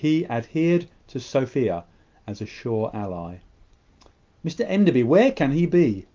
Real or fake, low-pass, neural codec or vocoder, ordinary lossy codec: real; 7.2 kHz; none; Opus, 24 kbps